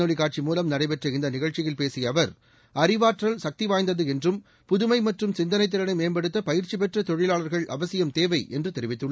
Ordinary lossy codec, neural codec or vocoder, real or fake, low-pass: none; none; real; none